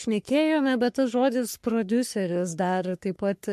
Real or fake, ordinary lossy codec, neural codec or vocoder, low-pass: fake; MP3, 64 kbps; codec, 44.1 kHz, 3.4 kbps, Pupu-Codec; 14.4 kHz